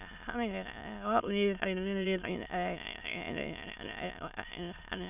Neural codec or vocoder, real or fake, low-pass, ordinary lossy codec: autoencoder, 22.05 kHz, a latent of 192 numbers a frame, VITS, trained on many speakers; fake; 3.6 kHz; none